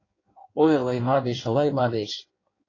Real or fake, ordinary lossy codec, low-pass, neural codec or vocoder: fake; AAC, 32 kbps; 7.2 kHz; codec, 16 kHz in and 24 kHz out, 1.1 kbps, FireRedTTS-2 codec